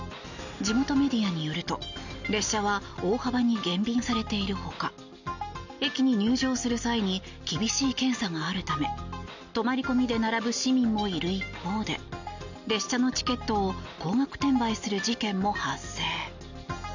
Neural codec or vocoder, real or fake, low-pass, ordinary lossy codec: none; real; 7.2 kHz; none